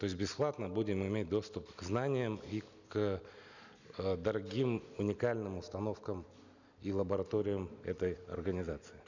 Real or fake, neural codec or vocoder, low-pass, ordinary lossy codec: real; none; 7.2 kHz; none